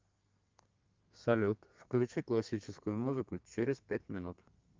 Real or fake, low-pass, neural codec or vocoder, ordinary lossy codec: fake; 7.2 kHz; codec, 32 kHz, 1.9 kbps, SNAC; Opus, 24 kbps